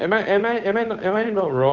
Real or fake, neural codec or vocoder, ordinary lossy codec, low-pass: fake; codec, 16 kHz, 8 kbps, FunCodec, trained on Chinese and English, 25 frames a second; none; 7.2 kHz